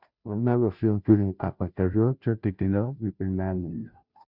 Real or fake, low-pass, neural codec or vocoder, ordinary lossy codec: fake; 5.4 kHz; codec, 16 kHz, 0.5 kbps, FunCodec, trained on Chinese and English, 25 frames a second; Opus, 64 kbps